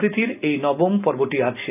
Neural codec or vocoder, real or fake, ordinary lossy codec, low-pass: none; real; none; 3.6 kHz